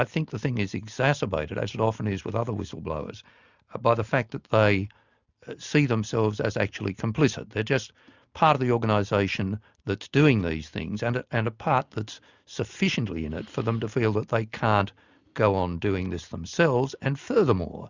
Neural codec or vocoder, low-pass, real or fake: none; 7.2 kHz; real